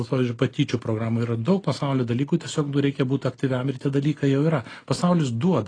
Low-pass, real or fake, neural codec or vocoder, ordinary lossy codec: 9.9 kHz; real; none; AAC, 32 kbps